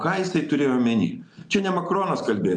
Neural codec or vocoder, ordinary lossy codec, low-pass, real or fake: none; MP3, 64 kbps; 9.9 kHz; real